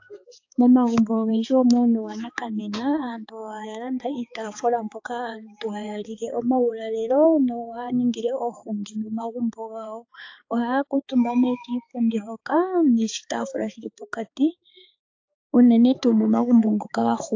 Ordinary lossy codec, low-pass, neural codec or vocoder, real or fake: AAC, 48 kbps; 7.2 kHz; codec, 16 kHz, 4 kbps, X-Codec, HuBERT features, trained on balanced general audio; fake